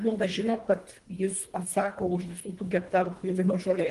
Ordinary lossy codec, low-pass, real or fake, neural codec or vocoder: Opus, 24 kbps; 10.8 kHz; fake; codec, 24 kHz, 1.5 kbps, HILCodec